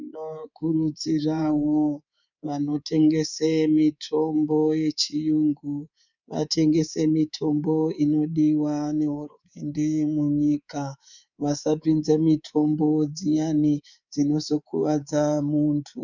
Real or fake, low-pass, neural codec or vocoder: fake; 7.2 kHz; codec, 24 kHz, 3.1 kbps, DualCodec